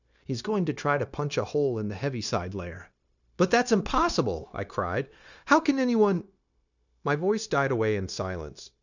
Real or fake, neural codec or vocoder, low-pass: fake; codec, 16 kHz, 0.9 kbps, LongCat-Audio-Codec; 7.2 kHz